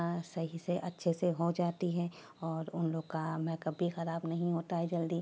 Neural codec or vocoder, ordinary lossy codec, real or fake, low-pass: none; none; real; none